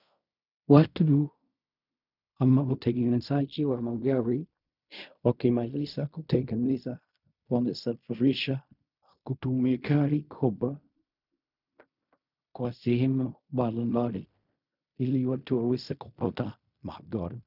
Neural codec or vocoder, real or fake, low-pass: codec, 16 kHz in and 24 kHz out, 0.4 kbps, LongCat-Audio-Codec, fine tuned four codebook decoder; fake; 5.4 kHz